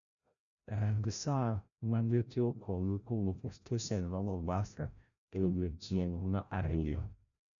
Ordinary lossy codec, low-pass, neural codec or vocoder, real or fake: none; 7.2 kHz; codec, 16 kHz, 0.5 kbps, FreqCodec, larger model; fake